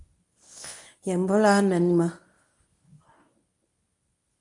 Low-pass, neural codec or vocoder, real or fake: 10.8 kHz; codec, 24 kHz, 0.9 kbps, WavTokenizer, medium speech release version 1; fake